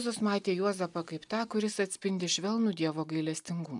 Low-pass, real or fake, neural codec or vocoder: 10.8 kHz; real; none